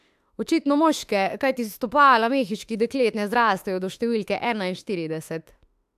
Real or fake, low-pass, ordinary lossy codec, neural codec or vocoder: fake; 14.4 kHz; AAC, 96 kbps; autoencoder, 48 kHz, 32 numbers a frame, DAC-VAE, trained on Japanese speech